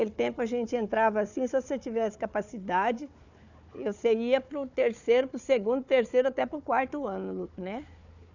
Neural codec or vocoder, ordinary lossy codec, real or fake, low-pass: codec, 16 kHz, 4 kbps, FunCodec, trained on Chinese and English, 50 frames a second; none; fake; 7.2 kHz